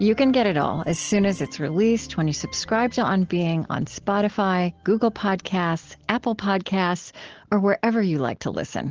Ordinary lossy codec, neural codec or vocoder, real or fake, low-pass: Opus, 16 kbps; none; real; 7.2 kHz